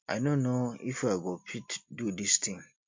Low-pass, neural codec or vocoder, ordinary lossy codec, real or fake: 7.2 kHz; none; MP3, 64 kbps; real